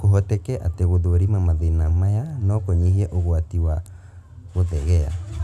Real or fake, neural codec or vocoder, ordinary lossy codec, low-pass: real; none; none; 14.4 kHz